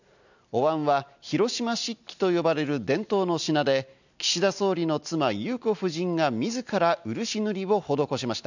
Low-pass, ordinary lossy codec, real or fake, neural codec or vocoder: 7.2 kHz; none; real; none